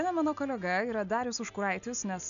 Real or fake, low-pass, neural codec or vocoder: real; 7.2 kHz; none